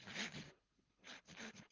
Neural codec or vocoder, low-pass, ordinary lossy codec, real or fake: codec, 24 kHz, 6 kbps, HILCodec; 7.2 kHz; Opus, 16 kbps; fake